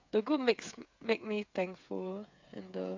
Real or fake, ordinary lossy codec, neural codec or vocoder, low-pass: fake; MP3, 64 kbps; codec, 16 kHz, 8 kbps, FreqCodec, smaller model; 7.2 kHz